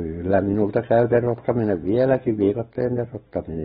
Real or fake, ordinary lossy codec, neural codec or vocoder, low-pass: real; AAC, 16 kbps; none; 10.8 kHz